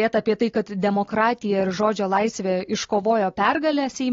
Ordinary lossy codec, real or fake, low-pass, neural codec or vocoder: AAC, 32 kbps; real; 7.2 kHz; none